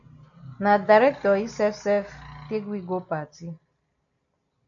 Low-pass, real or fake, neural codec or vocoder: 7.2 kHz; real; none